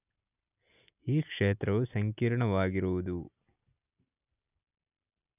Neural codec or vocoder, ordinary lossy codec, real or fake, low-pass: none; none; real; 3.6 kHz